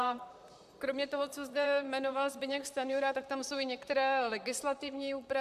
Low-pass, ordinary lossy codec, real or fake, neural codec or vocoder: 14.4 kHz; MP3, 96 kbps; fake; vocoder, 44.1 kHz, 128 mel bands, Pupu-Vocoder